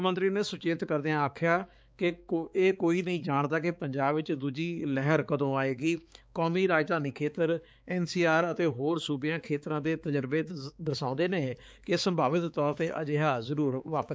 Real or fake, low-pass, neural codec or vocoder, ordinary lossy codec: fake; none; codec, 16 kHz, 4 kbps, X-Codec, HuBERT features, trained on balanced general audio; none